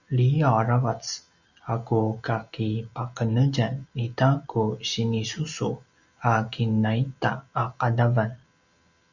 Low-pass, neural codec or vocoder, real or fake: 7.2 kHz; none; real